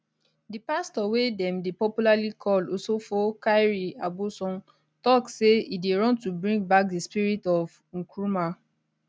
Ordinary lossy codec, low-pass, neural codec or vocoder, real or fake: none; none; none; real